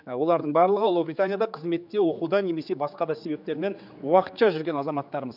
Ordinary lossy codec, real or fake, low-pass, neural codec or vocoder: none; fake; 5.4 kHz; codec, 16 kHz, 4 kbps, X-Codec, HuBERT features, trained on general audio